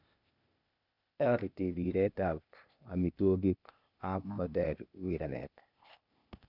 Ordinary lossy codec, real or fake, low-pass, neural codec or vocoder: none; fake; 5.4 kHz; codec, 16 kHz, 0.8 kbps, ZipCodec